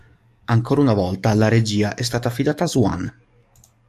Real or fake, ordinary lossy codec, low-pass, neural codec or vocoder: fake; AAC, 96 kbps; 14.4 kHz; codec, 44.1 kHz, 7.8 kbps, DAC